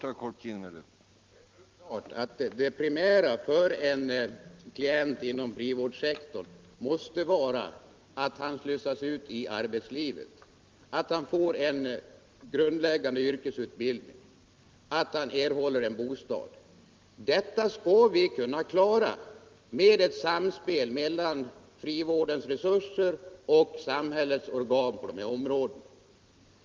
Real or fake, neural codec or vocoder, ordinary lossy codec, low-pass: real; none; Opus, 32 kbps; 7.2 kHz